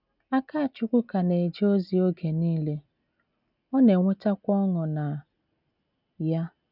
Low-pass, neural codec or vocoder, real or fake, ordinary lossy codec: 5.4 kHz; none; real; none